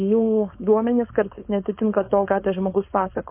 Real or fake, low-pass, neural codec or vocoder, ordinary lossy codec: fake; 3.6 kHz; codec, 16 kHz, 4.8 kbps, FACodec; MP3, 24 kbps